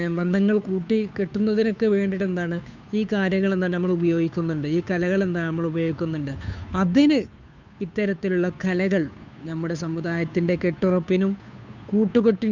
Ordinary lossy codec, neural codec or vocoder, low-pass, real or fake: none; codec, 16 kHz, 2 kbps, FunCodec, trained on Chinese and English, 25 frames a second; 7.2 kHz; fake